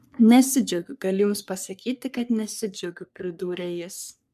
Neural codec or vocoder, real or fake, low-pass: codec, 44.1 kHz, 3.4 kbps, Pupu-Codec; fake; 14.4 kHz